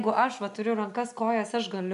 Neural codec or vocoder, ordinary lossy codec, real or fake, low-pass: none; MP3, 96 kbps; real; 10.8 kHz